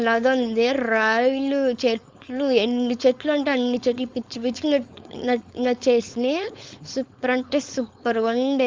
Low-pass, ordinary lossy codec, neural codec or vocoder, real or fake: 7.2 kHz; Opus, 32 kbps; codec, 16 kHz, 4.8 kbps, FACodec; fake